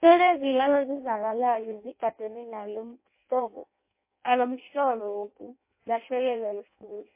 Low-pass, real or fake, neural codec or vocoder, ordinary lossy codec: 3.6 kHz; fake; codec, 16 kHz in and 24 kHz out, 0.6 kbps, FireRedTTS-2 codec; MP3, 24 kbps